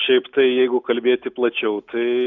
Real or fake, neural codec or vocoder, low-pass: real; none; 7.2 kHz